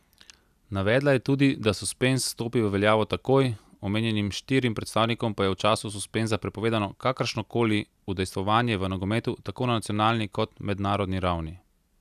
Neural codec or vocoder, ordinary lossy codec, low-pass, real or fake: none; none; 14.4 kHz; real